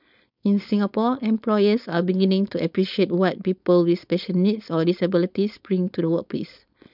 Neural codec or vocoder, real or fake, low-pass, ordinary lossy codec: codec, 16 kHz, 4.8 kbps, FACodec; fake; 5.4 kHz; none